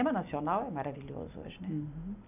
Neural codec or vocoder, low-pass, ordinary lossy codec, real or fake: none; 3.6 kHz; none; real